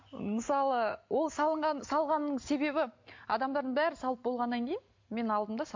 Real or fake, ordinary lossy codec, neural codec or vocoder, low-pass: real; MP3, 48 kbps; none; 7.2 kHz